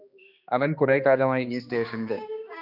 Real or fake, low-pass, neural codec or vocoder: fake; 5.4 kHz; codec, 16 kHz, 2 kbps, X-Codec, HuBERT features, trained on general audio